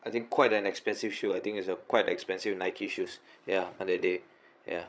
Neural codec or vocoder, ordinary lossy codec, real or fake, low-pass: codec, 16 kHz, 16 kbps, FreqCodec, larger model; none; fake; none